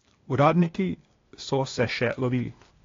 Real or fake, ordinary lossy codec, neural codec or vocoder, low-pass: fake; AAC, 32 kbps; codec, 16 kHz, 0.8 kbps, ZipCodec; 7.2 kHz